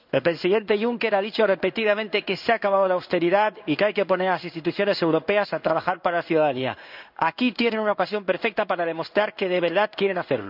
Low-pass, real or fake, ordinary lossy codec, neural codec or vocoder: 5.4 kHz; fake; none; codec, 16 kHz in and 24 kHz out, 1 kbps, XY-Tokenizer